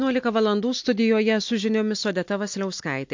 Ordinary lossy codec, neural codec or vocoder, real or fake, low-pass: MP3, 48 kbps; none; real; 7.2 kHz